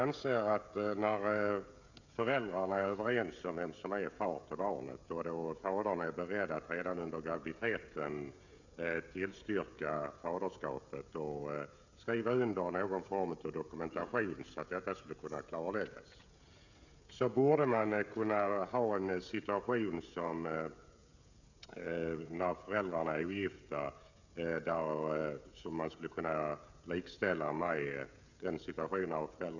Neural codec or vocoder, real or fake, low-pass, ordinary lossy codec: codec, 16 kHz, 16 kbps, FreqCodec, smaller model; fake; 7.2 kHz; none